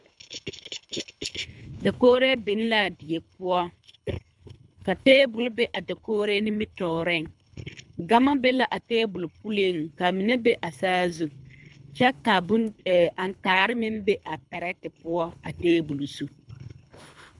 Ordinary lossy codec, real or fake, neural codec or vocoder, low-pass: MP3, 96 kbps; fake; codec, 24 kHz, 3 kbps, HILCodec; 10.8 kHz